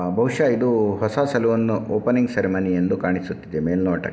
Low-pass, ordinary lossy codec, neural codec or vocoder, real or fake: none; none; none; real